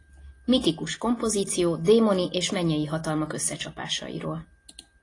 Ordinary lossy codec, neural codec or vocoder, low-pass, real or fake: AAC, 32 kbps; none; 10.8 kHz; real